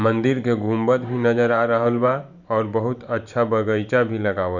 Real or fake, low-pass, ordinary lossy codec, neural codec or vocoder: fake; 7.2 kHz; none; vocoder, 44.1 kHz, 128 mel bands every 512 samples, BigVGAN v2